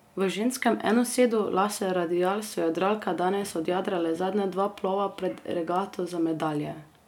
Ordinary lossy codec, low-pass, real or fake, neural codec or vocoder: none; 19.8 kHz; real; none